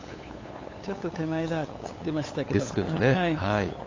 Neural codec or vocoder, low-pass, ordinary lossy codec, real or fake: codec, 16 kHz, 8 kbps, FunCodec, trained on LibriTTS, 25 frames a second; 7.2 kHz; AAC, 32 kbps; fake